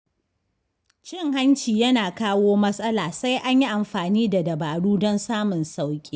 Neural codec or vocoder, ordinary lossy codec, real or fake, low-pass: none; none; real; none